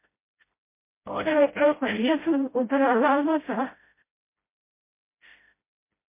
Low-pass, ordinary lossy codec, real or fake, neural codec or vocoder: 3.6 kHz; MP3, 24 kbps; fake; codec, 16 kHz, 0.5 kbps, FreqCodec, smaller model